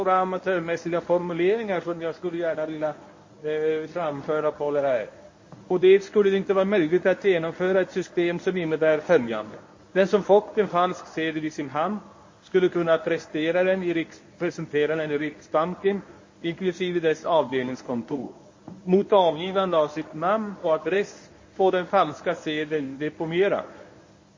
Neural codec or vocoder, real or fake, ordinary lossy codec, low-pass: codec, 24 kHz, 0.9 kbps, WavTokenizer, medium speech release version 1; fake; MP3, 32 kbps; 7.2 kHz